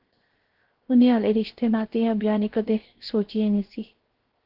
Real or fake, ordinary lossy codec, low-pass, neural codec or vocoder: fake; Opus, 16 kbps; 5.4 kHz; codec, 16 kHz, 0.7 kbps, FocalCodec